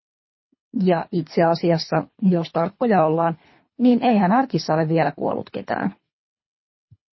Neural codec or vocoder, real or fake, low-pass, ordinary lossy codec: codec, 24 kHz, 3 kbps, HILCodec; fake; 7.2 kHz; MP3, 24 kbps